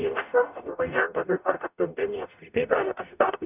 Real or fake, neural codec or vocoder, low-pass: fake; codec, 44.1 kHz, 0.9 kbps, DAC; 3.6 kHz